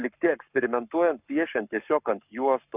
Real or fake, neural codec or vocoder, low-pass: fake; codec, 44.1 kHz, 7.8 kbps, Pupu-Codec; 3.6 kHz